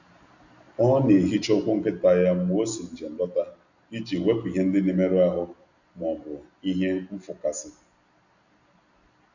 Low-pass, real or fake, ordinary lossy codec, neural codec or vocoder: 7.2 kHz; real; none; none